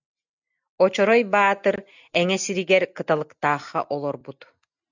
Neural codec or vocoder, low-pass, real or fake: none; 7.2 kHz; real